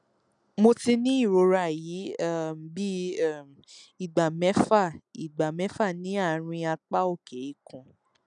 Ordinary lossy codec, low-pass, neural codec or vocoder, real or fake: none; 9.9 kHz; none; real